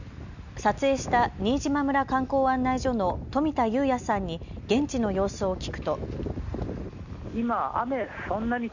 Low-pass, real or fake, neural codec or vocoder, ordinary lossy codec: 7.2 kHz; real; none; none